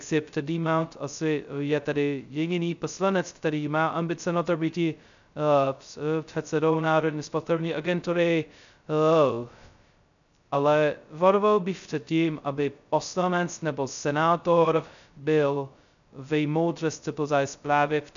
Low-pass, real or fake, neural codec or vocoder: 7.2 kHz; fake; codec, 16 kHz, 0.2 kbps, FocalCodec